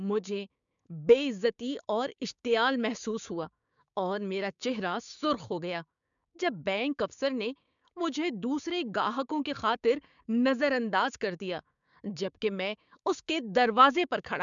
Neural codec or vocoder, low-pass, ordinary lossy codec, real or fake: codec, 16 kHz, 6 kbps, DAC; 7.2 kHz; MP3, 96 kbps; fake